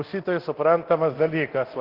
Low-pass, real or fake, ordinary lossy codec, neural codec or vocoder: 5.4 kHz; fake; Opus, 16 kbps; codec, 24 kHz, 0.9 kbps, DualCodec